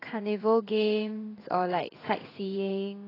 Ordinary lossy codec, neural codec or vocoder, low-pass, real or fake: AAC, 24 kbps; codec, 16 kHz in and 24 kHz out, 1 kbps, XY-Tokenizer; 5.4 kHz; fake